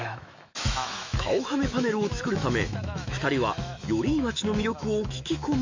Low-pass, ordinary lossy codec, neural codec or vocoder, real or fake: 7.2 kHz; MP3, 64 kbps; autoencoder, 48 kHz, 128 numbers a frame, DAC-VAE, trained on Japanese speech; fake